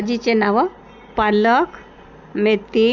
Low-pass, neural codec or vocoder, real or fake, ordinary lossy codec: 7.2 kHz; none; real; none